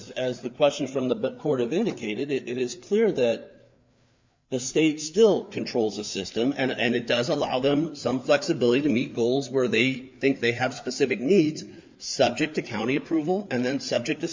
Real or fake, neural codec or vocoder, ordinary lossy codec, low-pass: fake; codec, 16 kHz, 4 kbps, FreqCodec, larger model; MP3, 64 kbps; 7.2 kHz